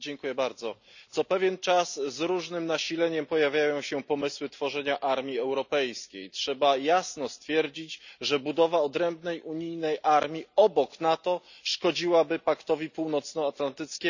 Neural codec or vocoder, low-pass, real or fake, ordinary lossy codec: none; 7.2 kHz; real; none